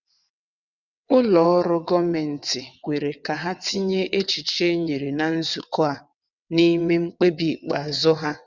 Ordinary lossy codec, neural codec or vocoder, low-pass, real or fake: none; vocoder, 22.05 kHz, 80 mel bands, WaveNeXt; 7.2 kHz; fake